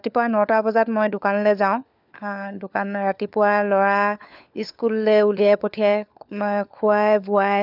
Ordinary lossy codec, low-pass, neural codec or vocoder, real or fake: none; 5.4 kHz; codec, 16 kHz, 4 kbps, FunCodec, trained on LibriTTS, 50 frames a second; fake